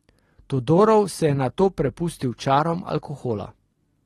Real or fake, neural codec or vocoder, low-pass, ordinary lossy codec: real; none; 19.8 kHz; AAC, 32 kbps